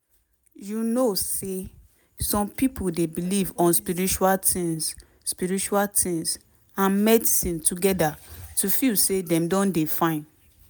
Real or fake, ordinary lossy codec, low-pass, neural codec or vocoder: real; none; none; none